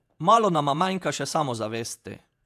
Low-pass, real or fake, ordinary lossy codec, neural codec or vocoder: 14.4 kHz; fake; AAC, 96 kbps; vocoder, 48 kHz, 128 mel bands, Vocos